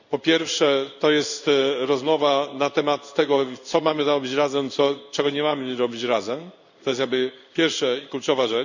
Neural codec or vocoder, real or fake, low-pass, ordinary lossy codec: codec, 16 kHz in and 24 kHz out, 1 kbps, XY-Tokenizer; fake; 7.2 kHz; none